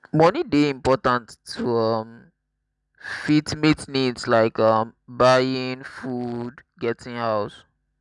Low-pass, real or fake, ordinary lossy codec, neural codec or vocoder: 10.8 kHz; real; none; none